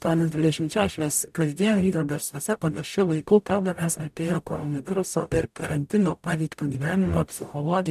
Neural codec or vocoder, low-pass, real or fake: codec, 44.1 kHz, 0.9 kbps, DAC; 14.4 kHz; fake